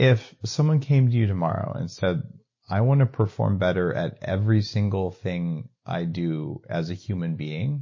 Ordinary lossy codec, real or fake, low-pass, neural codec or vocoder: MP3, 32 kbps; real; 7.2 kHz; none